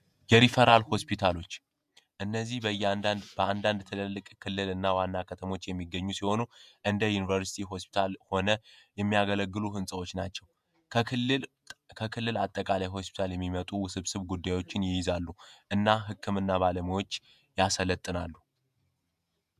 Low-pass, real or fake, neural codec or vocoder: 14.4 kHz; fake; vocoder, 48 kHz, 128 mel bands, Vocos